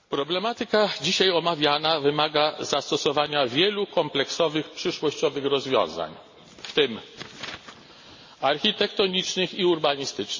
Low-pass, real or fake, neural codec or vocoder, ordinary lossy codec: 7.2 kHz; real; none; MP3, 32 kbps